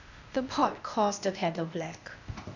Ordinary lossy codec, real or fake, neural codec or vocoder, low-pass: none; fake; codec, 16 kHz, 0.8 kbps, ZipCodec; 7.2 kHz